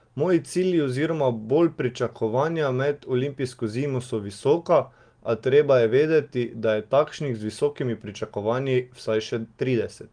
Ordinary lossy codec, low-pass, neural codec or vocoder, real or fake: Opus, 24 kbps; 9.9 kHz; none; real